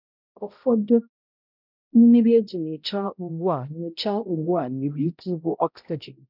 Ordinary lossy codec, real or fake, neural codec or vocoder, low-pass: none; fake; codec, 16 kHz, 0.5 kbps, X-Codec, HuBERT features, trained on balanced general audio; 5.4 kHz